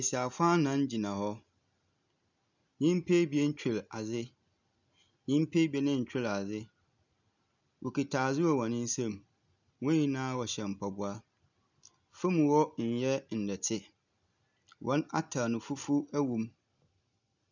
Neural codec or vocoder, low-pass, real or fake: none; 7.2 kHz; real